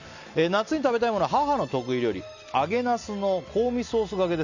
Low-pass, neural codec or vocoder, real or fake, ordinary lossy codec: 7.2 kHz; none; real; none